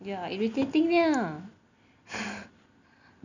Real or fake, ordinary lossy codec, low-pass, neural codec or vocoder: real; none; 7.2 kHz; none